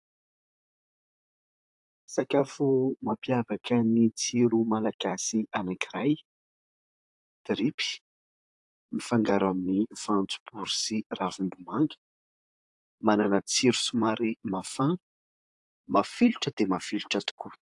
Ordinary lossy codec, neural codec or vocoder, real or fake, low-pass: AAC, 64 kbps; vocoder, 44.1 kHz, 128 mel bands, Pupu-Vocoder; fake; 10.8 kHz